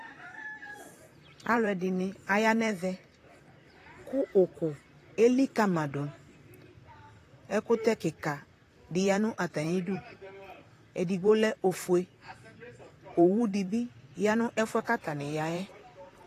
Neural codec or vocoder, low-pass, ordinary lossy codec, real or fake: vocoder, 44.1 kHz, 128 mel bands, Pupu-Vocoder; 14.4 kHz; AAC, 48 kbps; fake